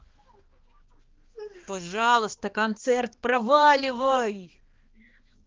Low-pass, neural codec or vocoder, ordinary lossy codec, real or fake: 7.2 kHz; codec, 16 kHz, 2 kbps, X-Codec, HuBERT features, trained on balanced general audio; Opus, 16 kbps; fake